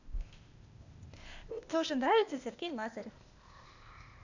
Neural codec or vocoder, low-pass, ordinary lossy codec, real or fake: codec, 16 kHz, 0.8 kbps, ZipCodec; 7.2 kHz; none; fake